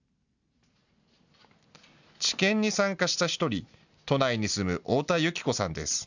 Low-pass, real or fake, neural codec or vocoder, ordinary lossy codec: 7.2 kHz; real; none; none